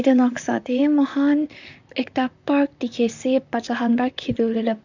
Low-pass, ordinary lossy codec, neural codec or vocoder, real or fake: 7.2 kHz; none; vocoder, 44.1 kHz, 128 mel bands, Pupu-Vocoder; fake